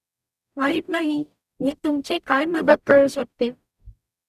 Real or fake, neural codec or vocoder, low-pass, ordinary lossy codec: fake; codec, 44.1 kHz, 0.9 kbps, DAC; 14.4 kHz; none